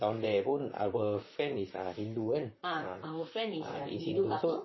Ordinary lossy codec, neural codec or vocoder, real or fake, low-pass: MP3, 24 kbps; vocoder, 44.1 kHz, 128 mel bands, Pupu-Vocoder; fake; 7.2 kHz